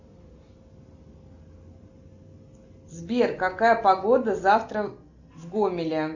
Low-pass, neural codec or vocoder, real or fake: 7.2 kHz; none; real